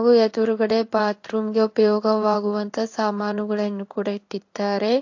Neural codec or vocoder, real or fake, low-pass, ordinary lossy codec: codec, 16 kHz in and 24 kHz out, 1 kbps, XY-Tokenizer; fake; 7.2 kHz; AAC, 48 kbps